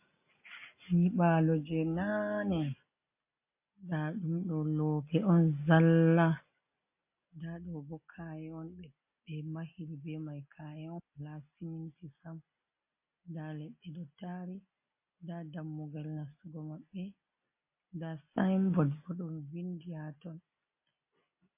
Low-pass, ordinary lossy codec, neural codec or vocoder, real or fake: 3.6 kHz; MP3, 24 kbps; none; real